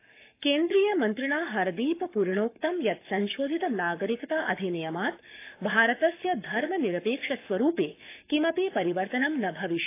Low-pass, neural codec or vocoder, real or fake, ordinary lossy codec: 3.6 kHz; codec, 44.1 kHz, 7.8 kbps, Pupu-Codec; fake; AAC, 24 kbps